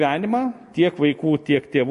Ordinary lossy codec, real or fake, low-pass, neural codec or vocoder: MP3, 48 kbps; real; 14.4 kHz; none